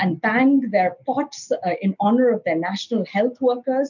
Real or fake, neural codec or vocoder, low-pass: real; none; 7.2 kHz